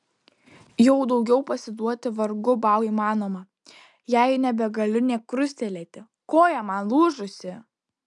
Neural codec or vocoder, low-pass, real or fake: none; 10.8 kHz; real